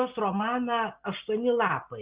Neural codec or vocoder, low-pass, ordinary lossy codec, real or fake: none; 3.6 kHz; Opus, 64 kbps; real